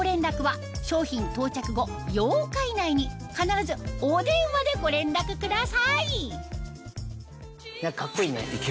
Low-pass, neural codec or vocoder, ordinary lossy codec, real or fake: none; none; none; real